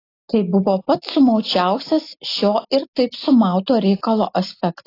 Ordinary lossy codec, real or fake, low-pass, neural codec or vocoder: AAC, 24 kbps; real; 5.4 kHz; none